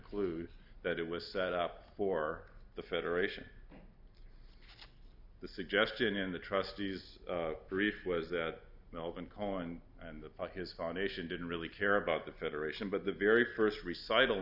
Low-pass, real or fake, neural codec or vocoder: 5.4 kHz; real; none